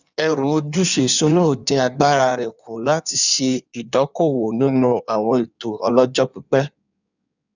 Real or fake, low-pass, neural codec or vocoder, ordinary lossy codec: fake; 7.2 kHz; codec, 16 kHz in and 24 kHz out, 1.1 kbps, FireRedTTS-2 codec; none